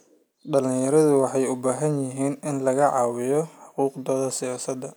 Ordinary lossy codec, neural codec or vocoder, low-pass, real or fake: none; none; none; real